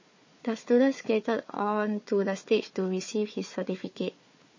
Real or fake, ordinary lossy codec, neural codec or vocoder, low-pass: fake; MP3, 32 kbps; codec, 16 kHz, 4 kbps, FunCodec, trained on Chinese and English, 50 frames a second; 7.2 kHz